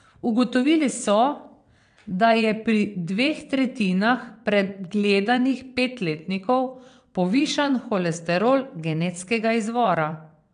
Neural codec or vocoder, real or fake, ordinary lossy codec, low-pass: vocoder, 22.05 kHz, 80 mel bands, WaveNeXt; fake; MP3, 96 kbps; 9.9 kHz